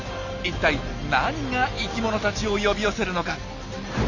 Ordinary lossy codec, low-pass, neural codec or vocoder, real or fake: none; 7.2 kHz; none; real